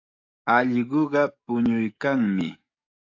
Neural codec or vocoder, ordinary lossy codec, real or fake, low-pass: codec, 44.1 kHz, 7.8 kbps, DAC; AAC, 48 kbps; fake; 7.2 kHz